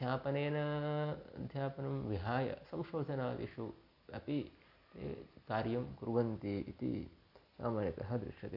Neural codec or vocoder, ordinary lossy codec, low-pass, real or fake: none; none; 5.4 kHz; real